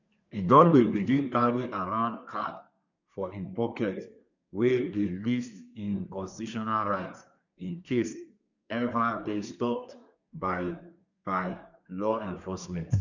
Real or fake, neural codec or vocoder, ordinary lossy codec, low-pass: fake; codec, 24 kHz, 1 kbps, SNAC; none; 7.2 kHz